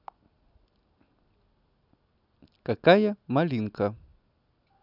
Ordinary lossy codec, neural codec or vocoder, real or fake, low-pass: none; none; real; 5.4 kHz